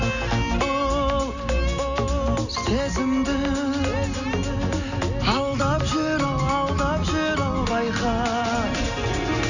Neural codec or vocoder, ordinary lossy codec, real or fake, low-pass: none; none; real; 7.2 kHz